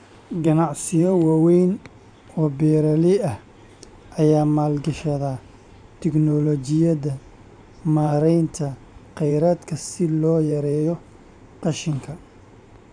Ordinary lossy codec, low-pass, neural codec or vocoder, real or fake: none; 9.9 kHz; vocoder, 44.1 kHz, 128 mel bands every 512 samples, BigVGAN v2; fake